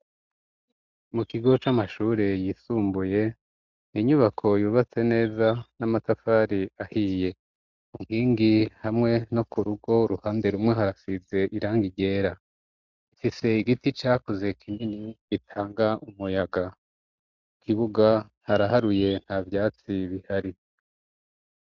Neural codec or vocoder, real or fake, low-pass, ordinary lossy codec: none; real; 7.2 kHz; Opus, 64 kbps